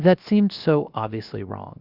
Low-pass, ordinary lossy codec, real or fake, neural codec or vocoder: 5.4 kHz; Opus, 64 kbps; fake; codec, 16 kHz, 0.7 kbps, FocalCodec